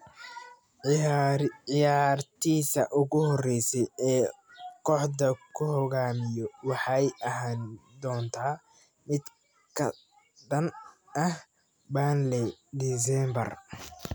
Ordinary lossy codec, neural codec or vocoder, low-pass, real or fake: none; none; none; real